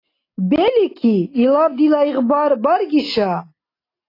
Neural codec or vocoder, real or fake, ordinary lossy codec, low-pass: none; real; AAC, 24 kbps; 5.4 kHz